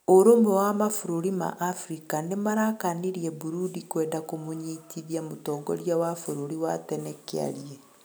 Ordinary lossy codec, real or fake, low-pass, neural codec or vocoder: none; real; none; none